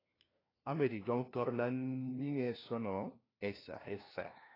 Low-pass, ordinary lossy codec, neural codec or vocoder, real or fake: 5.4 kHz; AAC, 24 kbps; codec, 16 kHz, 1 kbps, FunCodec, trained on LibriTTS, 50 frames a second; fake